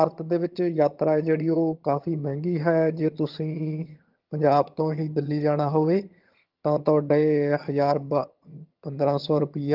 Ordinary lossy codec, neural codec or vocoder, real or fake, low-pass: Opus, 32 kbps; vocoder, 22.05 kHz, 80 mel bands, HiFi-GAN; fake; 5.4 kHz